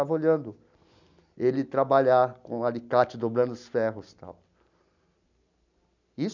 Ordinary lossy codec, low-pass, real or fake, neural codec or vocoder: none; 7.2 kHz; real; none